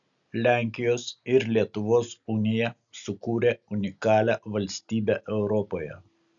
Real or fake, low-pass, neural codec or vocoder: real; 7.2 kHz; none